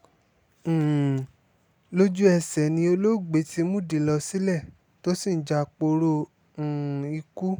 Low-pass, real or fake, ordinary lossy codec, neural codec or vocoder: none; real; none; none